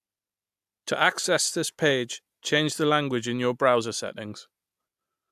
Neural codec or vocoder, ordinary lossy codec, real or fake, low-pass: none; AAC, 96 kbps; real; 14.4 kHz